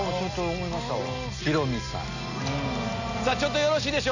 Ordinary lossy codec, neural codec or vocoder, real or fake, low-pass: none; none; real; 7.2 kHz